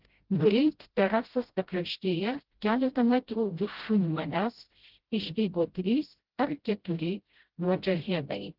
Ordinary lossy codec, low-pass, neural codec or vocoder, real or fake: Opus, 16 kbps; 5.4 kHz; codec, 16 kHz, 0.5 kbps, FreqCodec, smaller model; fake